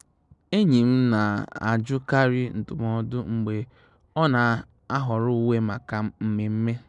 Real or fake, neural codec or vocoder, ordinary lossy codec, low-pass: real; none; none; 10.8 kHz